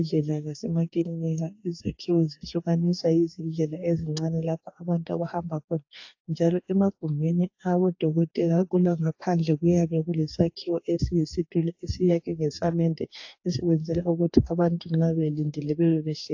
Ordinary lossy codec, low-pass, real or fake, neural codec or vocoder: AAC, 48 kbps; 7.2 kHz; fake; codec, 44.1 kHz, 2.6 kbps, DAC